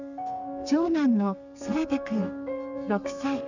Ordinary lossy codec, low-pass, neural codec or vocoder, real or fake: none; 7.2 kHz; codec, 32 kHz, 1.9 kbps, SNAC; fake